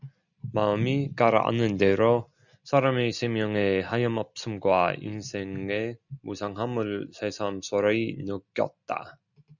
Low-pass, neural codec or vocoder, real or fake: 7.2 kHz; none; real